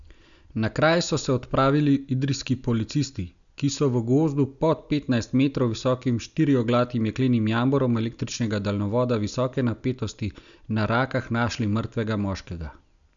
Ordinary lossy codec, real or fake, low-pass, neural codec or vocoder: none; real; 7.2 kHz; none